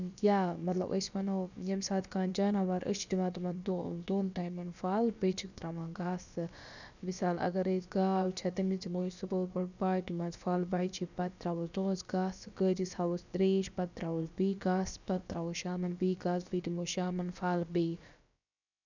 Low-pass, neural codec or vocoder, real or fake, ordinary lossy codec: 7.2 kHz; codec, 16 kHz, about 1 kbps, DyCAST, with the encoder's durations; fake; none